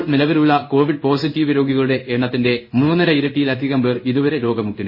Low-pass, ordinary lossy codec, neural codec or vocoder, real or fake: 5.4 kHz; MP3, 24 kbps; codec, 16 kHz in and 24 kHz out, 1 kbps, XY-Tokenizer; fake